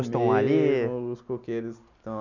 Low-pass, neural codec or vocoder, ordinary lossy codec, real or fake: 7.2 kHz; none; none; real